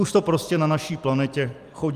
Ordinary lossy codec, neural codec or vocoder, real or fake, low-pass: Opus, 32 kbps; vocoder, 44.1 kHz, 128 mel bands every 256 samples, BigVGAN v2; fake; 14.4 kHz